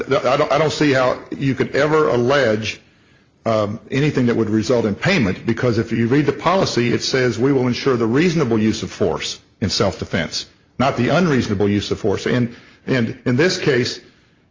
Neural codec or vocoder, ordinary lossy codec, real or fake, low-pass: none; Opus, 32 kbps; real; 7.2 kHz